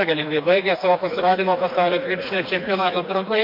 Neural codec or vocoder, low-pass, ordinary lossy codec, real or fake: codec, 16 kHz, 2 kbps, FreqCodec, smaller model; 5.4 kHz; MP3, 32 kbps; fake